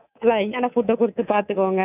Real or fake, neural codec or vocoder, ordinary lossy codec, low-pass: fake; codec, 24 kHz, 3.1 kbps, DualCodec; none; 3.6 kHz